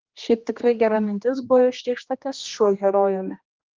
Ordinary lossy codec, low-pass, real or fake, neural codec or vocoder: Opus, 24 kbps; 7.2 kHz; fake; codec, 16 kHz, 1 kbps, X-Codec, HuBERT features, trained on general audio